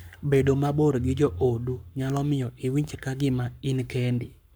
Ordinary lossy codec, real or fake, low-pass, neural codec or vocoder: none; fake; none; codec, 44.1 kHz, 7.8 kbps, Pupu-Codec